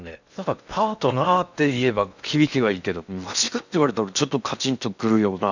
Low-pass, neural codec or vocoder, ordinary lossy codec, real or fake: 7.2 kHz; codec, 16 kHz in and 24 kHz out, 0.8 kbps, FocalCodec, streaming, 65536 codes; none; fake